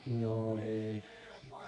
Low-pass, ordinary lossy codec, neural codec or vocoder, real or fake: 10.8 kHz; none; codec, 24 kHz, 0.9 kbps, WavTokenizer, medium music audio release; fake